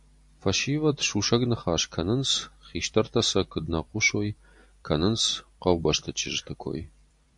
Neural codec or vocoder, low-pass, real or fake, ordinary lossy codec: vocoder, 44.1 kHz, 128 mel bands every 256 samples, BigVGAN v2; 10.8 kHz; fake; MP3, 64 kbps